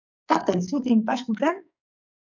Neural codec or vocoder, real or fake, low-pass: codec, 44.1 kHz, 2.6 kbps, SNAC; fake; 7.2 kHz